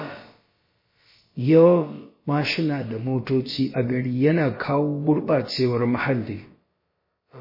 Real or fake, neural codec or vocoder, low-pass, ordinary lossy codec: fake; codec, 16 kHz, about 1 kbps, DyCAST, with the encoder's durations; 5.4 kHz; MP3, 24 kbps